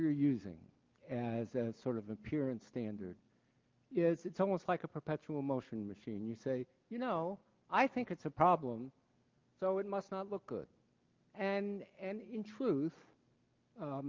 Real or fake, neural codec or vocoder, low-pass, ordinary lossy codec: real; none; 7.2 kHz; Opus, 32 kbps